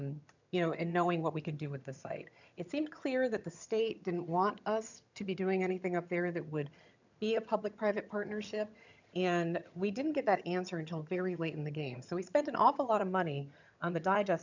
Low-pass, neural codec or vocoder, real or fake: 7.2 kHz; vocoder, 22.05 kHz, 80 mel bands, HiFi-GAN; fake